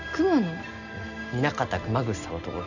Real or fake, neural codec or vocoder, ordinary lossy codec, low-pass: real; none; none; 7.2 kHz